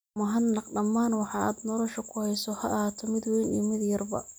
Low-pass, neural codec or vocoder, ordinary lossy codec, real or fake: none; none; none; real